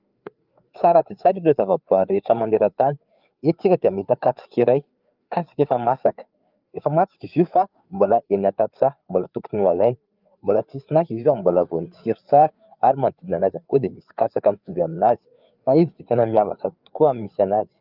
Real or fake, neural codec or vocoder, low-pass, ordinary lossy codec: fake; codec, 16 kHz, 4 kbps, FreqCodec, larger model; 5.4 kHz; Opus, 24 kbps